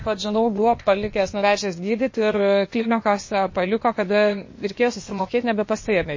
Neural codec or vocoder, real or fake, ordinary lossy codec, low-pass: codec, 16 kHz, 0.8 kbps, ZipCodec; fake; MP3, 32 kbps; 7.2 kHz